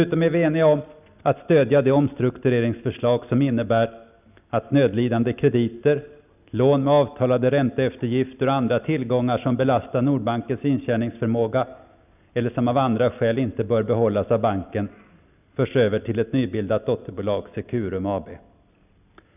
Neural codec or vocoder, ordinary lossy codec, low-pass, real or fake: none; none; 3.6 kHz; real